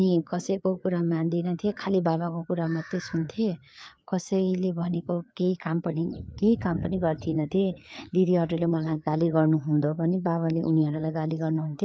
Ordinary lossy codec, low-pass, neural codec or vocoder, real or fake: none; none; codec, 16 kHz, 4 kbps, FreqCodec, larger model; fake